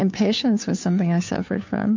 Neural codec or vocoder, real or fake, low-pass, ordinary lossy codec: codec, 16 kHz, 2 kbps, FunCodec, trained on Chinese and English, 25 frames a second; fake; 7.2 kHz; MP3, 48 kbps